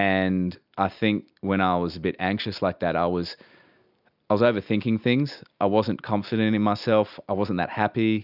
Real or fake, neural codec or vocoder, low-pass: real; none; 5.4 kHz